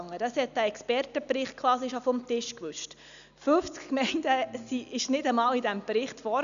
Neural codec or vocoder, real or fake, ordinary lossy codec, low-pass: none; real; none; 7.2 kHz